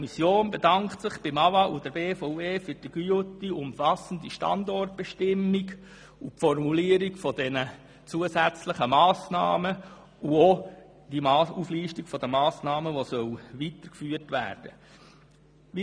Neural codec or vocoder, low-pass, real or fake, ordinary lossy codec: none; 9.9 kHz; real; none